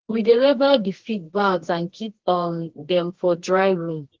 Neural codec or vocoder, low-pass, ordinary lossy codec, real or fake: codec, 24 kHz, 0.9 kbps, WavTokenizer, medium music audio release; 7.2 kHz; Opus, 16 kbps; fake